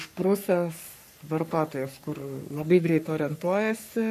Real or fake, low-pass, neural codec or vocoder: fake; 14.4 kHz; codec, 44.1 kHz, 3.4 kbps, Pupu-Codec